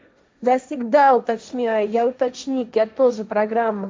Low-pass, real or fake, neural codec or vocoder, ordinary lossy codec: none; fake; codec, 16 kHz, 1.1 kbps, Voila-Tokenizer; none